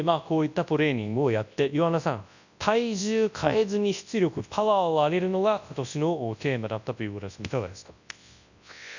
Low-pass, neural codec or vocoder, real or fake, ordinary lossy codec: 7.2 kHz; codec, 24 kHz, 0.9 kbps, WavTokenizer, large speech release; fake; none